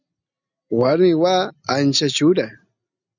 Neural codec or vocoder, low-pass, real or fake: none; 7.2 kHz; real